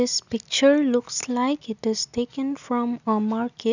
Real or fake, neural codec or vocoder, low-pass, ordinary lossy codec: real; none; 7.2 kHz; none